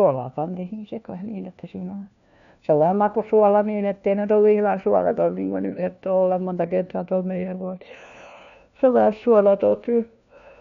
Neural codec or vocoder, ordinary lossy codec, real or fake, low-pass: codec, 16 kHz, 1 kbps, FunCodec, trained on LibriTTS, 50 frames a second; none; fake; 7.2 kHz